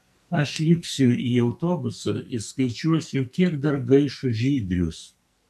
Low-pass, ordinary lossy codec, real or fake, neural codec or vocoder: 14.4 kHz; AAC, 96 kbps; fake; codec, 44.1 kHz, 2.6 kbps, SNAC